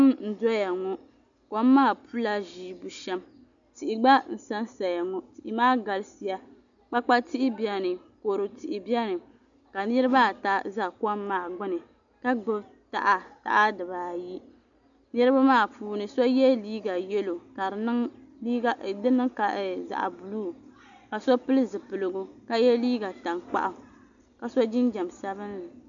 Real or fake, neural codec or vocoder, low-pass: real; none; 7.2 kHz